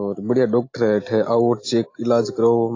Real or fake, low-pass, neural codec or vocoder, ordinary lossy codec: real; 7.2 kHz; none; AAC, 32 kbps